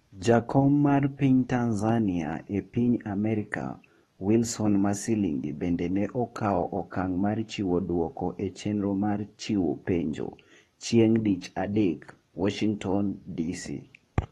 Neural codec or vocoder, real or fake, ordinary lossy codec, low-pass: codec, 44.1 kHz, 7.8 kbps, DAC; fake; AAC, 32 kbps; 19.8 kHz